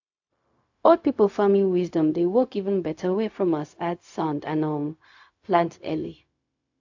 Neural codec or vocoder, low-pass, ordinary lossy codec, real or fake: codec, 16 kHz, 0.4 kbps, LongCat-Audio-Codec; 7.2 kHz; AAC, 48 kbps; fake